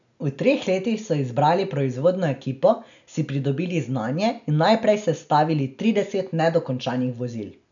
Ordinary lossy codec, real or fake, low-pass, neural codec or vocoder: none; real; 7.2 kHz; none